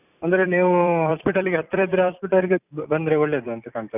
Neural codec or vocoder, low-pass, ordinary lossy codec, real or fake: vocoder, 44.1 kHz, 128 mel bands every 256 samples, BigVGAN v2; 3.6 kHz; none; fake